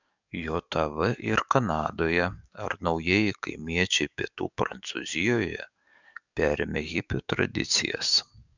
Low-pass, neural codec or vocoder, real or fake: 7.2 kHz; codec, 44.1 kHz, 7.8 kbps, DAC; fake